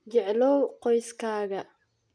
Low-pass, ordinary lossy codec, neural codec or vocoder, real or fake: 9.9 kHz; none; none; real